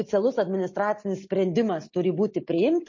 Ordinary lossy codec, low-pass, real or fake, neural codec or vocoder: MP3, 32 kbps; 7.2 kHz; real; none